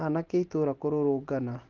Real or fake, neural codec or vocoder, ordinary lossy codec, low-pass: real; none; Opus, 16 kbps; 7.2 kHz